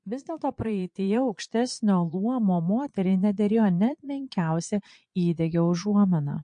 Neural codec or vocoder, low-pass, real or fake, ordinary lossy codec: vocoder, 22.05 kHz, 80 mel bands, Vocos; 9.9 kHz; fake; MP3, 48 kbps